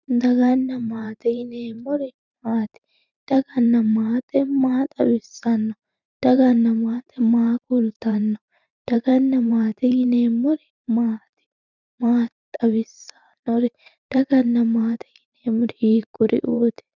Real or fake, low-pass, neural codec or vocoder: fake; 7.2 kHz; vocoder, 44.1 kHz, 128 mel bands every 512 samples, BigVGAN v2